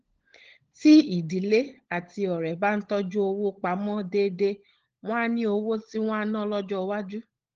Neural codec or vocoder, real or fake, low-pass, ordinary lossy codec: codec, 16 kHz, 16 kbps, FunCodec, trained on LibriTTS, 50 frames a second; fake; 7.2 kHz; Opus, 16 kbps